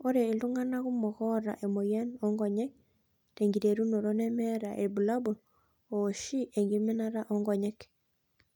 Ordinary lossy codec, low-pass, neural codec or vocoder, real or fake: none; 19.8 kHz; none; real